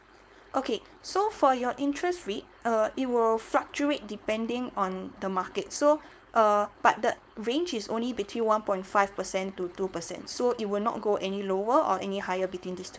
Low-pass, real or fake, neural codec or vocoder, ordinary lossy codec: none; fake; codec, 16 kHz, 4.8 kbps, FACodec; none